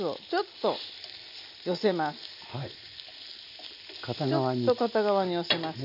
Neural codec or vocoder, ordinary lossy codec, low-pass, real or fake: none; none; 5.4 kHz; real